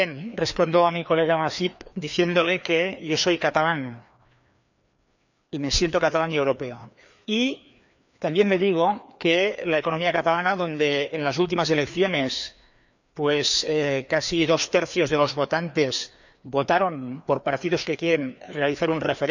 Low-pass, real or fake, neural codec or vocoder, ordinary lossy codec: 7.2 kHz; fake; codec, 16 kHz, 2 kbps, FreqCodec, larger model; none